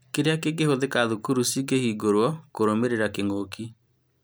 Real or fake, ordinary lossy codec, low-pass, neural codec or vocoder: real; none; none; none